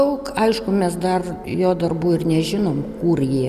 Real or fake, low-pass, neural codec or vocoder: real; 14.4 kHz; none